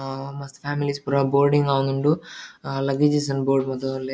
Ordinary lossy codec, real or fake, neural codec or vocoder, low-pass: none; real; none; none